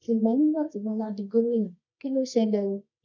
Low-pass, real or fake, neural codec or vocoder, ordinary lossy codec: 7.2 kHz; fake; codec, 24 kHz, 0.9 kbps, WavTokenizer, medium music audio release; none